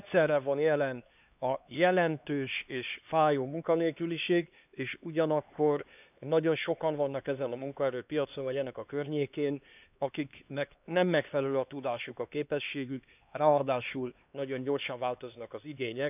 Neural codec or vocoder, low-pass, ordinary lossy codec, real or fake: codec, 16 kHz, 2 kbps, X-Codec, HuBERT features, trained on LibriSpeech; 3.6 kHz; none; fake